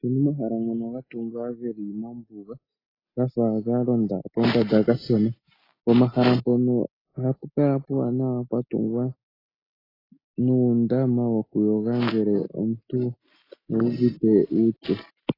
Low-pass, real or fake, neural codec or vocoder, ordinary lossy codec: 5.4 kHz; real; none; AAC, 24 kbps